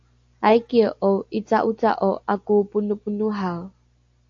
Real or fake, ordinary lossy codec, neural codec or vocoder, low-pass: real; AAC, 48 kbps; none; 7.2 kHz